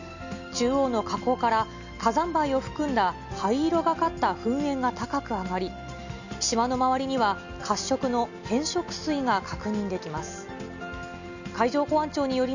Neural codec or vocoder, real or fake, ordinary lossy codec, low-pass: none; real; none; 7.2 kHz